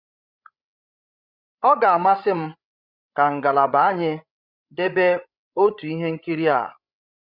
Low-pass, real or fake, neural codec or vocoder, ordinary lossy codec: 5.4 kHz; fake; codec, 16 kHz, 16 kbps, FreqCodec, larger model; Opus, 64 kbps